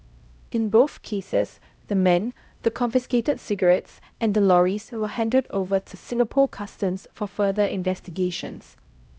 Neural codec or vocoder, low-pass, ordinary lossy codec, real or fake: codec, 16 kHz, 0.5 kbps, X-Codec, HuBERT features, trained on LibriSpeech; none; none; fake